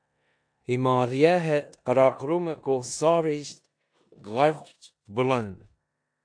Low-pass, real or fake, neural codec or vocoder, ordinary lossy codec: 9.9 kHz; fake; codec, 16 kHz in and 24 kHz out, 0.9 kbps, LongCat-Audio-Codec, four codebook decoder; AAC, 64 kbps